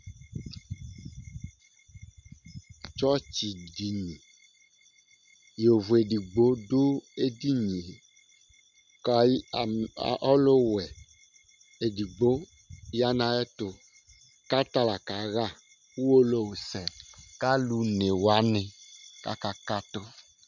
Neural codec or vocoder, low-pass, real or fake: none; 7.2 kHz; real